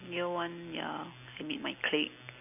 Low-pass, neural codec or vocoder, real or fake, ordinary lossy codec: 3.6 kHz; none; real; none